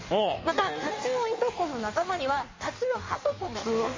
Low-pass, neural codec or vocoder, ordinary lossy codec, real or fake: 7.2 kHz; codec, 16 kHz in and 24 kHz out, 1.1 kbps, FireRedTTS-2 codec; MP3, 32 kbps; fake